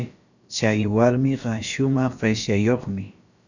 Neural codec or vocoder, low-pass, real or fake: codec, 16 kHz, about 1 kbps, DyCAST, with the encoder's durations; 7.2 kHz; fake